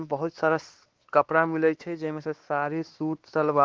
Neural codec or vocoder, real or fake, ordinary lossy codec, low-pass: codec, 16 kHz, 2 kbps, X-Codec, WavLM features, trained on Multilingual LibriSpeech; fake; Opus, 16 kbps; 7.2 kHz